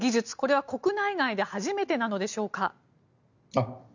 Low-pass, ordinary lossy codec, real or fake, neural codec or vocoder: 7.2 kHz; none; real; none